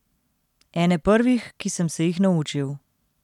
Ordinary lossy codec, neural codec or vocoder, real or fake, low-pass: none; none; real; 19.8 kHz